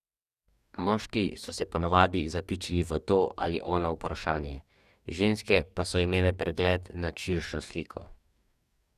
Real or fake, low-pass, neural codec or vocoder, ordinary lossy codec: fake; 14.4 kHz; codec, 44.1 kHz, 2.6 kbps, DAC; none